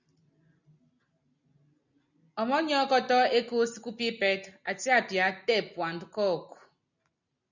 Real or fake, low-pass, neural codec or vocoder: real; 7.2 kHz; none